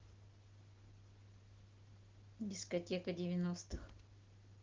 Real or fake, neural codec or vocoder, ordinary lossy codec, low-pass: real; none; Opus, 16 kbps; 7.2 kHz